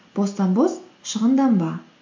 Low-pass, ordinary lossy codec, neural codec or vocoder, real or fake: 7.2 kHz; MP3, 48 kbps; none; real